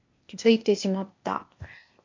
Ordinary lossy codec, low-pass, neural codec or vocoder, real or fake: MP3, 48 kbps; 7.2 kHz; codec, 16 kHz, 0.8 kbps, ZipCodec; fake